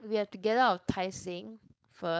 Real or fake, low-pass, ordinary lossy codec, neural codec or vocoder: fake; none; none; codec, 16 kHz, 4.8 kbps, FACodec